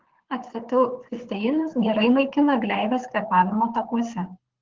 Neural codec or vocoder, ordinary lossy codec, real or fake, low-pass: codec, 24 kHz, 6 kbps, HILCodec; Opus, 16 kbps; fake; 7.2 kHz